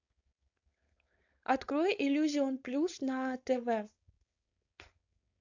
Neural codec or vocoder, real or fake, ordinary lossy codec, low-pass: codec, 16 kHz, 4.8 kbps, FACodec; fake; AAC, 48 kbps; 7.2 kHz